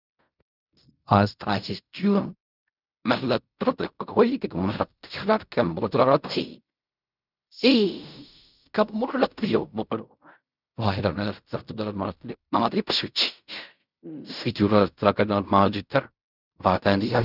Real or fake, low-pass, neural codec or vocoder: fake; 5.4 kHz; codec, 16 kHz in and 24 kHz out, 0.4 kbps, LongCat-Audio-Codec, fine tuned four codebook decoder